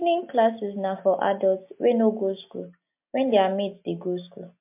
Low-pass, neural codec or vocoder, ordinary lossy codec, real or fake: 3.6 kHz; none; MP3, 32 kbps; real